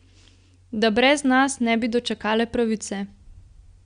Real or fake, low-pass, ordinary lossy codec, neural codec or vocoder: real; 9.9 kHz; Opus, 64 kbps; none